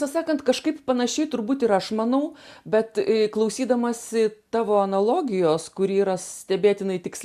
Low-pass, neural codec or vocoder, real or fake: 14.4 kHz; none; real